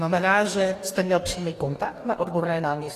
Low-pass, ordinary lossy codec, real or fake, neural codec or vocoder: 14.4 kHz; AAC, 48 kbps; fake; codec, 44.1 kHz, 2.6 kbps, DAC